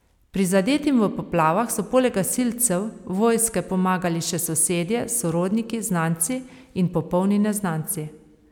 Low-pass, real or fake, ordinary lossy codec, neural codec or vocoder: 19.8 kHz; real; none; none